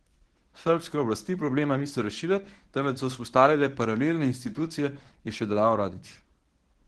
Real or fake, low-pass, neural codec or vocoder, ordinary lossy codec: fake; 10.8 kHz; codec, 24 kHz, 0.9 kbps, WavTokenizer, medium speech release version 1; Opus, 16 kbps